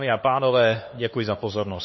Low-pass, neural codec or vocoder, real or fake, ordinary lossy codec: 7.2 kHz; codec, 16 kHz, 4 kbps, X-Codec, HuBERT features, trained on LibriSpeech; fake; MP3, 24 kbps